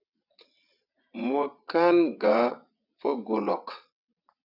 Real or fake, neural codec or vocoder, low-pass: fake; vocoder, 22.05 kHz, 80 mel bands, WaveNeXt; 5.4 kHz